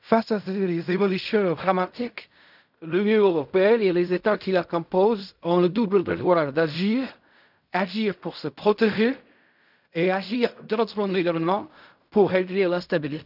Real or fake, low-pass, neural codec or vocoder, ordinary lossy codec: fake; 5.4 kHz; codec, 16 kHz in and 24 kHz out, 0.4 kbps, LongCat-Audio-Codec, fine tuned four codebook decoder; none